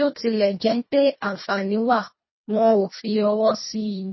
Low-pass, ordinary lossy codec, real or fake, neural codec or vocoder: 7.2 kHz; MP3, 24 kbps; fake; codec, 24 kHz, 1.5 kbps, HILCodec